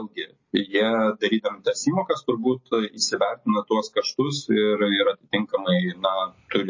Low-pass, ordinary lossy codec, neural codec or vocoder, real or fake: 7.2 kHz; MP3, 32 kbps; none; real